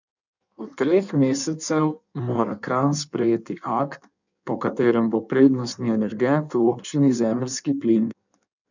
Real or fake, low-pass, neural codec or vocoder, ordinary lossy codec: fake; 7.2 kHz; codec, 16 kHz in and 24 kHz out, 1.1 kbps, FireRedTTS-2 codec; none